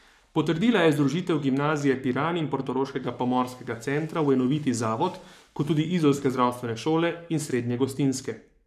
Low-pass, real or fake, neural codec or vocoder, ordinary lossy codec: 14.4 kHz; fake; codec, 44.1 kHz, 7.8 kbps, Pupu-Codec; none